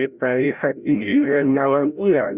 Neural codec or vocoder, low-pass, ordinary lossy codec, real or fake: codec, 16 kHz, 0.5 kbps, FreqCodec, larger model; 3.6 kHz; Opus, 64 kbps; fake